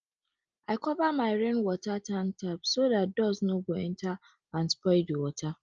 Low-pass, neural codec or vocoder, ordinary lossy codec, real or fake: 7.2 kHz; none; Opus, 24 kbps; real